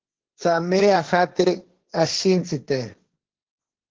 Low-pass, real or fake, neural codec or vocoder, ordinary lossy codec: 7.2 kHz; fake; codec, 16 kHz, 1.1 kbps, Voila-Tokenizer; Opus, 16 kbps